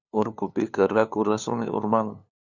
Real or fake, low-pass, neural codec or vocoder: fake; 7.2 kHz; codec, 16 kHz, 2 kbps, FunCodec, trained on LibriTTS, 25 frames a second